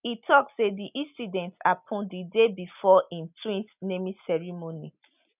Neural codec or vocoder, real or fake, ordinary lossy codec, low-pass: none; real; none; 3.6 kHz